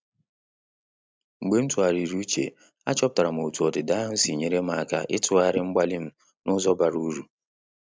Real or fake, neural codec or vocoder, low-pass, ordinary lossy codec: real; none; none; none